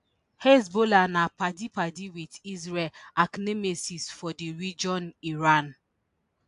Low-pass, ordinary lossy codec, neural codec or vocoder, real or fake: 10.8 kHz; AAC, 64 kbps; none; real